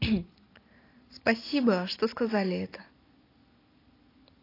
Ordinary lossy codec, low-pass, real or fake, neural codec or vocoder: AAC, 24 kbps; 5.4 kHz; real; none